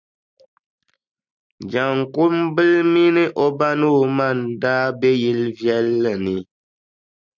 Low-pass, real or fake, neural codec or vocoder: 7.2 kHz; real; none